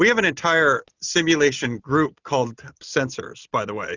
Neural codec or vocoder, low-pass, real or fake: none; 7.2 kHz; real